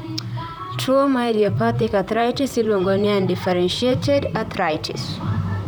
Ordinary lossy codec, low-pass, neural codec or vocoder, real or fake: none; none; vocoder, 44.1 kHz, 128 mel bands, Pupu-Vocoder; fake